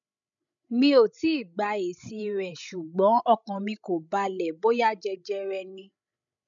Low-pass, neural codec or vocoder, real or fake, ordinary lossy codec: 7.2 kHz; codec, 16 kHz, 16 kbps, FreqCodec, larger model; fake; none